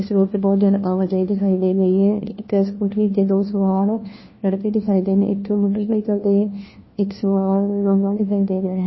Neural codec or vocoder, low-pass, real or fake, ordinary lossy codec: codec, 16 kHz, 1 kbps, FunCodec, trained on LibriTTS, 50 frames a second; 7.2 kHz; fake; MP3, 24 kbps